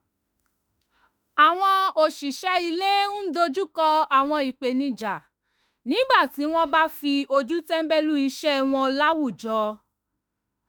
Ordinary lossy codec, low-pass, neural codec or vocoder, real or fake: none; none; autoencoder, 48 kHz, 32 numbers a frame, DAC-VAE, trained on Japanese speech; fake